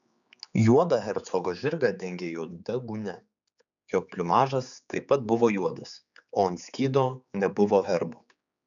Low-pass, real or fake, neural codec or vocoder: 7.2 kHz; fake; codec, 16 kHz, 4 kbps, X-Codec, HuBERT features, trained on general audio